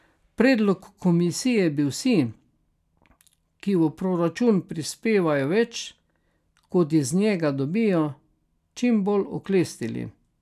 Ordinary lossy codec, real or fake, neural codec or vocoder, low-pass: none; real; none; 14.4 kHz